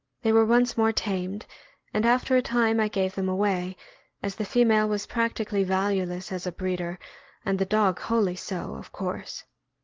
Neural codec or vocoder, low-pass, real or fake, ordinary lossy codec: none; 7.2 kHz; real; Opus, 16 kbps